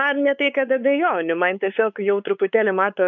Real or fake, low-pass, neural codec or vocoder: fake; 7.2 kHz; codec, 16 kHz, 4 kbps, X-Codec, WavLM features, trained on Multilingual LibriSpeech